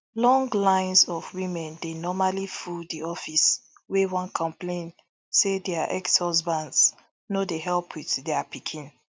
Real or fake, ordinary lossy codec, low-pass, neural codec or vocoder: real; none; none; none